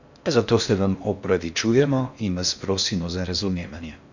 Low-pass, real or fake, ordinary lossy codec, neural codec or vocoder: 7.2 kHz; fake; none; codec, 16 kHz in and 24 kHz out, 0.6 kbps, FocalCodec, streaming, 4096 codes